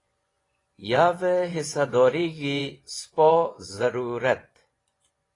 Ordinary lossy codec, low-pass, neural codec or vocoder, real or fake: AAC, 32 kbps; 10.8 kHz; vocoder, 24 kHz, 100 mel bands, Vocos; fake